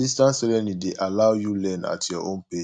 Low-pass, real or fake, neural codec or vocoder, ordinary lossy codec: 9.9 kHz; real; none; none